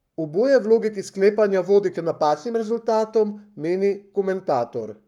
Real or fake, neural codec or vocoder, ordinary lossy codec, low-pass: fake; codec, 44.1 kHz, 7.8 kbps, Pupu-Codec; none; 19.8 kHz